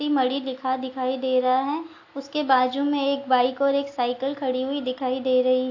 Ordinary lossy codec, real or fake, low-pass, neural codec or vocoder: AAC, 48 kbps; real; 7.2 kHz; none